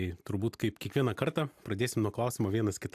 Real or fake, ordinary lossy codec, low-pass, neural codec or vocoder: real; AAC, 96 kbps; 14.4 kHz; none